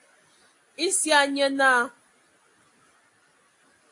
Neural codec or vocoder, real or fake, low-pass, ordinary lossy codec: none; real; 10.8 kHz; AAC, 48 kbps